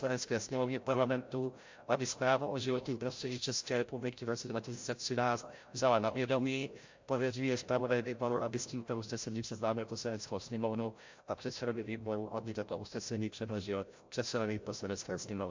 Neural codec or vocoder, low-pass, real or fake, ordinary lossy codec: codec, 16 kHz, 0.5 kbps, FreqCodec, larger model; 7.2 kHz; fake; MP3, 48 kbps